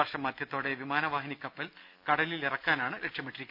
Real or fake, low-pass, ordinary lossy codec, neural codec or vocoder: real; 5.4 kHz; none; none